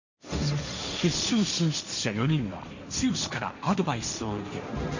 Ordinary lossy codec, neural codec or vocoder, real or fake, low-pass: none; codec, 16 kHz, 1.1 kbps, Voila-Tokenizer; fake; 7.2 kHz